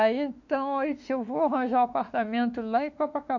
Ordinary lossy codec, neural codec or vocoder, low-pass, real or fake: none; autoencoder, 48 kHz, 32 numbers a frame, DAC-VAE, trained on Japanese speech; 7.2 kHz; fake